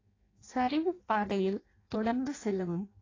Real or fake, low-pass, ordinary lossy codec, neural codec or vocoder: fake; 7.2 kHz; AAC, 32 kbps; codec, 16 kHz in and 24 kHz out, 0.6 kbps, FireRedTTS-2 codec